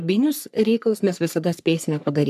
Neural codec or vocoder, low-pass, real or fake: codec, 44.1 kHz, 3.4 kbps, Pupu-Codec; 14.4 kHz; fake